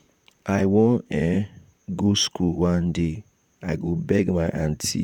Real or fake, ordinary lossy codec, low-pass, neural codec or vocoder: fake; none; 19.8 kHz; vocoder, 44.1 kHz, 128 mel bands, Pupu-Vocoder